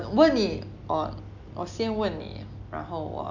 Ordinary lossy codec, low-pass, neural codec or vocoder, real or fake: none; 7.2 kHz; none; real